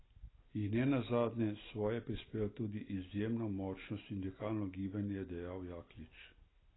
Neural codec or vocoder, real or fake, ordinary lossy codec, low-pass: none; real; AAC, 16 kbps; 7.2 kHz